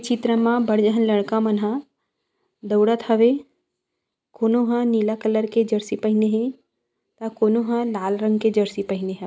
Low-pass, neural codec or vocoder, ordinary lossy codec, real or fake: none; none; none; real